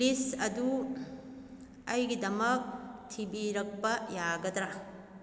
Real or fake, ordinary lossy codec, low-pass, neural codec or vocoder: real; none; none; none